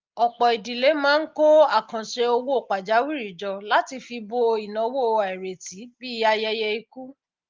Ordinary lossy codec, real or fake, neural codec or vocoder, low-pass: Opus, 32 kbps; real; none; 7.2 kHz